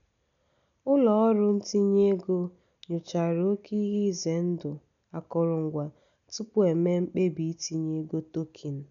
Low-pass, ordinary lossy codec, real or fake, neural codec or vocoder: 7.2 kHz; none; real; none